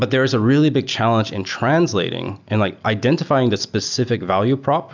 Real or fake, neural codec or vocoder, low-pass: real; none; 7.2 kHz